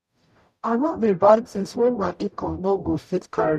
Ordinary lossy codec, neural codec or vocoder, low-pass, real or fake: none; codec, 44.1 kHz, 0.9 kbps, DAC; 14.4 kHz; fake